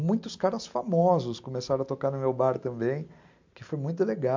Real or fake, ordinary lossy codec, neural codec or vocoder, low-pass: real; MP3, 64 kbps; none; 7.2 kHz